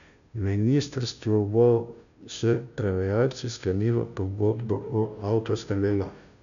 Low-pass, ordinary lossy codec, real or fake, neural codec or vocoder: 7.2 kHz; none; fake; codec, 16 kHz, 0.5 kbps, FunCodec, trained on Chinese and English, 25 frames a second